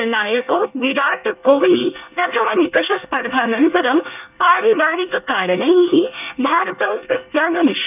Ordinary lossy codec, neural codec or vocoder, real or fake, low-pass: none; codec, 24 kHz, 1 kbps, SNAC; fake; 3.6 kHz